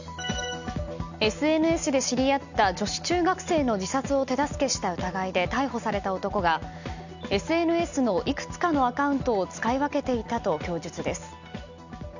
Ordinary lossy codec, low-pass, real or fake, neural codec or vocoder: none; 7.2 kHz; real; none